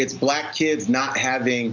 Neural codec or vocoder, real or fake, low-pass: none; real; 7.2 kHz